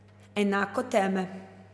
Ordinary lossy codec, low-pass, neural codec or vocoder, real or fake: none; none; none; real